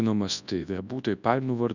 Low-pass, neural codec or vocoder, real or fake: 7.2 kHz; codec, 24 kHz, 0.9 kbps, WavTokenizer, large speech release; fake